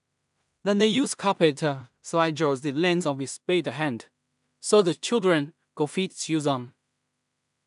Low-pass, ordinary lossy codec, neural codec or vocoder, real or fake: 10.8 kHz; none; codec, 16 kHz in and 24 kHz out, 0.4 kbps, LongCat-Audio-Codec, two codebook decoder; fake